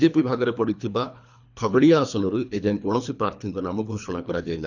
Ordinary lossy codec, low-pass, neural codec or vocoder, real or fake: none; 7.2 kHz; codec, 24 kHz, 3 kbps, HILCodec; fake